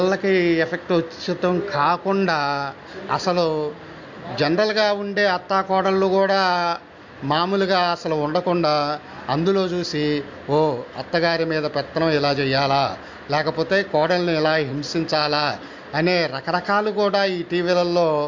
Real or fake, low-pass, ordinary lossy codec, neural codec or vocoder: real; 7.2 kHz; MP3, 48 kbps; none